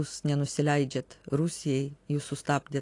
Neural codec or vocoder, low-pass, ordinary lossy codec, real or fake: none; 10.8 kHz; AAC, 48 kbps; real